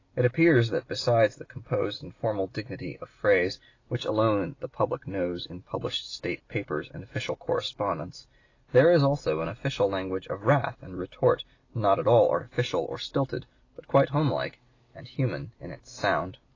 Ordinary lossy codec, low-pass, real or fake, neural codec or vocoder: AAC, 32 kbps; 7.2 kHz; real; none